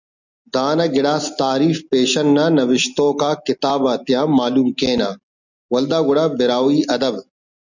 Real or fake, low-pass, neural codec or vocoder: real; 7.2 kHz; none